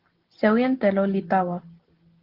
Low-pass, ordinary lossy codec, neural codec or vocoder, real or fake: 5.4 kHz; Opus, 16 kbps; none; real